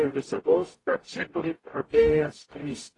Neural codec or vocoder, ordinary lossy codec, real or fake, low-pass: codec, 44.1 kHz, 0.9 kbps, DAC; AAC, 32 kbps; fake; 10.8 kHz